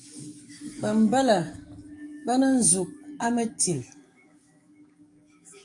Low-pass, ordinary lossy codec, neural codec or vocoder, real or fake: 10.8 kHz; AAC, 64 kbps; codec, 44.1 kHz, 7.8 kbps, DAC; fake